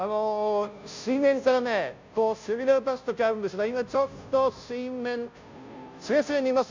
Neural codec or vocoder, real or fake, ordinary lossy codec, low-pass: codec, 16 kHz, 0.5 kbps, FunCodec, trained on Chinese and English, 25 frames a second; fake; MP3, 64 kbps; 7.2 kHz